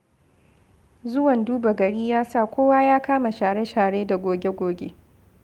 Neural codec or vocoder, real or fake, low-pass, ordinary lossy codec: none; real; 19.8 kHz; Opus, 32 kbps